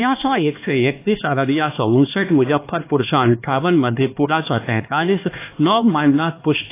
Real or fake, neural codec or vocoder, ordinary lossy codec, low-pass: fake; codec, 16 kHz, 2 kbps, X-Codec, HuBERT features, trained on LibriSpeech; AAC, 24 kbps; 3.6 kHz